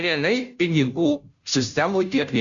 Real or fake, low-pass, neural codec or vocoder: fake; 7.2 kHz; codec, 16 kHz, 0.5 kbps, FunCodec, trained on Chinese and English, 25 frames a second